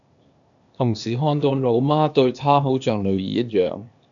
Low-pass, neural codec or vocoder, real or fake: 7.2 kHz; codec, 16 kHz, 0.8 kbps, ZipCodec; fake